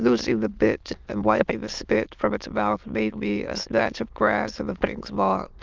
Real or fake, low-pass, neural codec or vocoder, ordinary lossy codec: fake; 7.2 kHz; autoencoder, 22.05 kHz, a latent of 192 numbers a frame, VITS, trained on many speakers; Opus, 32 kbps